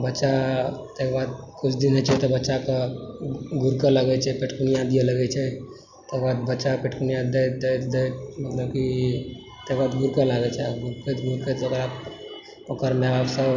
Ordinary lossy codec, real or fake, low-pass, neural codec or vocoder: none; real; 7.2 kHz; none